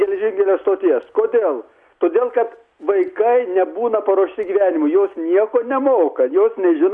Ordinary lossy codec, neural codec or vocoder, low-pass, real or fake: Opus, 64 kbps; none; 10.8 kHz; real